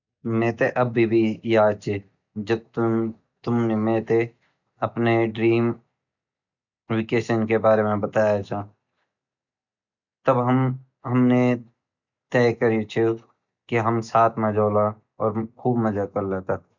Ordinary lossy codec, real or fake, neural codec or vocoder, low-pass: none; real; none; 7.2 kHz